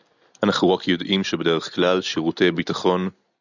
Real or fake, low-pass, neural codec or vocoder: real; 7.2 kHz; none